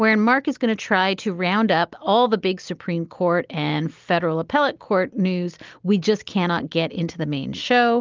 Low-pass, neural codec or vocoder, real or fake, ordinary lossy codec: 7.2 kHz; none; real; Opus, 32 kbps